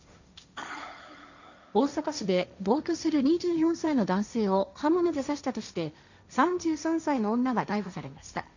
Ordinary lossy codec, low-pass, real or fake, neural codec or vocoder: none; 7.2 kHz; fake; codec, 16 kHz, 1.1 kbps, Voila-Tokenizer